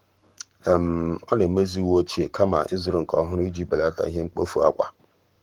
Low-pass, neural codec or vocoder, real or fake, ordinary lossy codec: 19.8 kHz; codec, 44.1 kHz, 7.8 kbps, Pupu-Codec; fake; Opus, 24 kbps